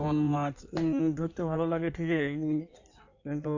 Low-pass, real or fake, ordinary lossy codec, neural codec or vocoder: 7.2 kHz; fake; AAC, 48 kbps; codec, 16 kHz in and 24 kHz out, 1.1 kbps, FireRedTTS-2 codec